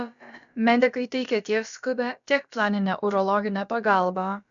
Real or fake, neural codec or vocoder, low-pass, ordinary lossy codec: fake; codec, 16 kHz, about 1 kbps, DyCAST, with the encoder's durations; 7.2 kHz; MP3, 96 kbps